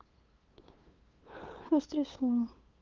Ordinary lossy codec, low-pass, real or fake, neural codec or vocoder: Opus, 24 kbps; 7.2 kHz; fake; codec, 16 kHz, 2 kbps, FunCodec, trained on LibriTTS, 25 frames a second